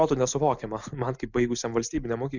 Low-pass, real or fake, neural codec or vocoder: 7.2 kHz; real; none